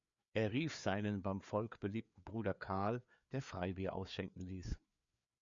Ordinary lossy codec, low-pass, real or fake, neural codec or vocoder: MP3, 64 kbps; 7.2 kHz; fake; codec, 16 kHz, 4 kbps, FreqCodec, larger model